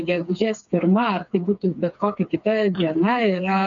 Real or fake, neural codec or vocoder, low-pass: fake; codec, 16 kHz, 4 kbps, FreqCodec, smaller model; 7.2 kHz